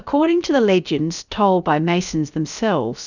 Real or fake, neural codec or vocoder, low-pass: fake; codec, 16 kHz, about 1 kbps, DyCAST, with the encoder's durations; 7.2 kHz